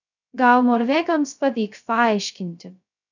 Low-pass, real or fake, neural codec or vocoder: 7.2 kHz; fake; codec, 16 kHz, 0.2 kbps, FocalCodec